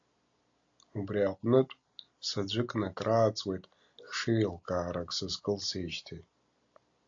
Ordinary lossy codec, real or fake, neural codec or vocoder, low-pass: AAC, 48 kbps; real; none; 7.2 kHz